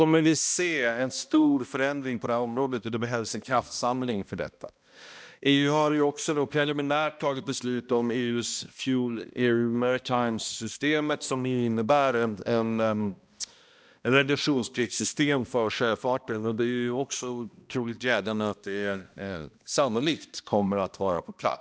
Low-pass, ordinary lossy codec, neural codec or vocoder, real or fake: none; none; codec, 16 kHz, 1 kbps, X-Codec, HuBERT features, trained on balanced general audio; fake